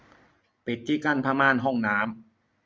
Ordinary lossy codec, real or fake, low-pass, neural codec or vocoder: none; real; none; none